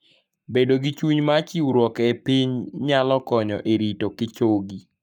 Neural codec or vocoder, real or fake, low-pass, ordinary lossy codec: codec, 44.1 kHz, 7.8 kbps, Pupu-Codec; fake; 19.8 kHz; none